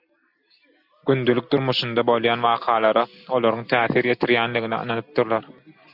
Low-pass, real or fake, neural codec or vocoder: 5.4 kHz; real; none